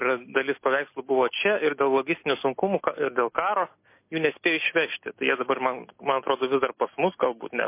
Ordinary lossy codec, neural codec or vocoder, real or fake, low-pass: MP3, 24 kbps; none; real; 3.6 kHz